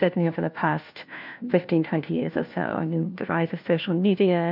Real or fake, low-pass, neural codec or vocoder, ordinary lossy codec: fake; 5.4 kHz; codec, 16 kHz, 1 kbps, FunCodec, trained on LibriTTS, 50 frames a second; MP3, 48 kbps